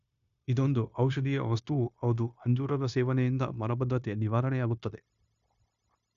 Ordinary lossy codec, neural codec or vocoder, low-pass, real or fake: none; codec, 16 kHz, 0.9 kbps, LongCat-Audio-Codec; 7.2 kHz; fake